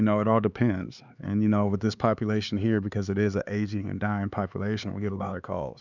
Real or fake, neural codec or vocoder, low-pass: fake; codec, 16 kHz, 4 kbps, X-Codec, HuBERT features, trained on LibriSpeech; 7.2 kHz